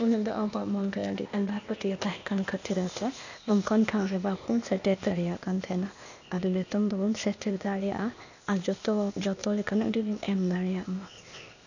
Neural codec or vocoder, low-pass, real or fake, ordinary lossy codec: codec, 16 kHz, 0.8 kbps, ZipCodec; 7.2 kHz; fake; none